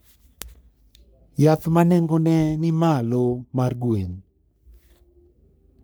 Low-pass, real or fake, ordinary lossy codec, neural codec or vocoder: none; fake; none; codec, 44.1 kHz, 3.4 kbps, Pupu-Codec